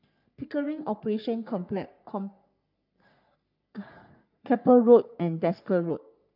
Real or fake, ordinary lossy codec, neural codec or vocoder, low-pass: fake; none; codec, 44.1 kHz, 3.4 kbps, Pupu-Codec; 5.4 kHz